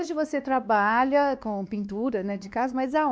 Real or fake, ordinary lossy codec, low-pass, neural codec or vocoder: fake; none; none; codec, 16 kHz, 2 kbps, X-Codec, WavLM features, trained on Multilingual LibriSpeech